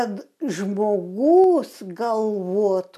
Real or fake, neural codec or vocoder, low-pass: real; none; 14.4 kHz